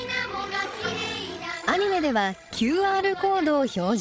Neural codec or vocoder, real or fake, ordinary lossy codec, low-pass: codec, 16 kHz, 16 kbps, FreqCodec, larger model; fake; none; none